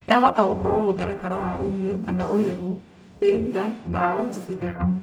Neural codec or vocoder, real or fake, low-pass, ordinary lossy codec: codec, 44.1 kHz, 0.9 kbps, DAC; fake; 19.8 kHz; none